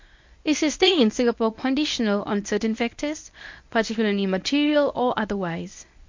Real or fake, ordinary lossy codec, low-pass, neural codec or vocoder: fake; MP3, 48 kbps; 7.2 kHz; codec, 24 kHz, 0.9 kbps, WavTokenizer, medium speech release version 1